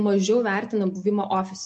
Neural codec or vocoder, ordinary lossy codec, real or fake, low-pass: none; MP3, 48 kbps; real; 10.8 kHz